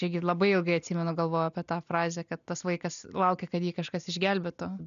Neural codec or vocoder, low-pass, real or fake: none; 7.2 kHz; real